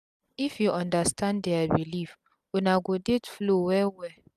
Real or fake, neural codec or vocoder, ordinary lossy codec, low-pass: real; none; none; 14.4 kHz